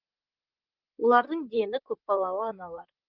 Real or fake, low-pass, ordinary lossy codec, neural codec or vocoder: fake; 5.4 kHz; Opus, 24 kbps; vocoder, 44.1 kHz, 128 mel bands, Pupu-Vocoder